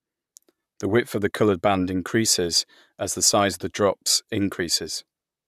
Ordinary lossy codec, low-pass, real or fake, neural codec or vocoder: none; 14.4 kHz; fake; vocoder, 44.1 kHz, 128 mel bands, Pupu-Vocoder